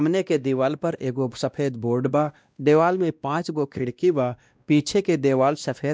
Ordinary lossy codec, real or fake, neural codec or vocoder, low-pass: none; fake; codec, 16 kHz, 1 kbps, X-Codec, WavLM features, trained on Multilingual LibriSpeech; none